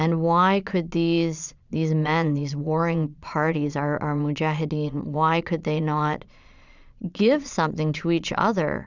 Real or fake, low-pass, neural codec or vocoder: fake; 7.2 kHz; vocoder, 22.05 kHz, 80 mel bands, Vocos